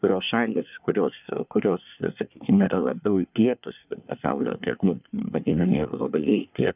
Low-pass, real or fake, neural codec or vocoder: 3.6 kHz; fake; codec, 24 kHz, 1 kbps, SNAC